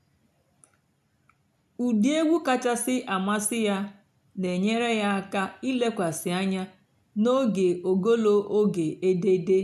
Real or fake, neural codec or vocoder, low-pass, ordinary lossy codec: real; none; 14.4 kHz; none